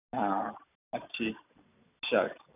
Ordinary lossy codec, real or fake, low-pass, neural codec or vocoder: none; real; 3.6 kHz; none